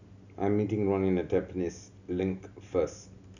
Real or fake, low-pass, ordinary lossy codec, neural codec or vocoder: real; 7.2 kHz; none; none